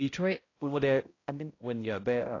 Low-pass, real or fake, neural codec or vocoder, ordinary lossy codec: 7.2 kHz; fake; codec, 16 kHz, 0.5 kbps, X-Codec, HuBERT features, trained on balanced general audio; AAC, 32 kbps